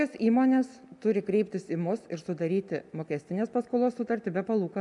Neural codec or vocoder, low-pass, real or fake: none; 10.8 kHz; real